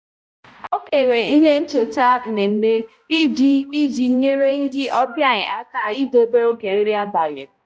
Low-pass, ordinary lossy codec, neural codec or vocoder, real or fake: none; none; codec, 16 kHz, 0.5 kbps, X-Codec, HuBERT features, trained on balanced general audio; fake